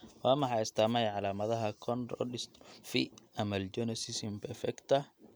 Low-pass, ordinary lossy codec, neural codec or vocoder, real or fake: none; none; none; real